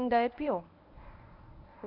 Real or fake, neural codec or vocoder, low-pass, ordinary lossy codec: fake; codec, 24 kHz, 0.9 kbps, WavTokenizer, medium speech release version 1; 5.4 kHz; none